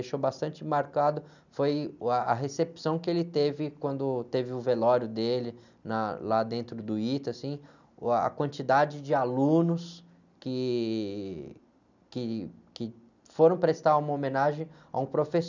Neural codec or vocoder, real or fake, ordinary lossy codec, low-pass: none; real; none; 7.2 kHz